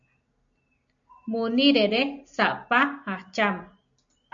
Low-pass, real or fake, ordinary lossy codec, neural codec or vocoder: 7.2 kHz; real; MP3, 64 kbps; none